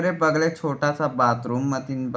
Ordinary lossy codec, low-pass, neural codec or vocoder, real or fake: none; none; none; real